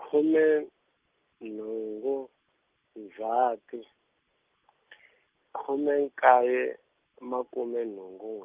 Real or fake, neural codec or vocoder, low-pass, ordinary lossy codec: real; none; 3.6 kHz; Opus, 32 kbps